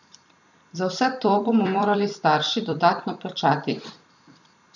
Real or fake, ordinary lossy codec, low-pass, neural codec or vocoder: fake; none; 7.2 kHz; vocoder, 44.1 kHz, 128 mel bands every 256 samples, BigVGAN v2